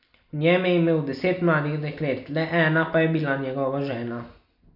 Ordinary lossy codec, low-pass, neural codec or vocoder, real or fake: none; 5.4 kHz; none; real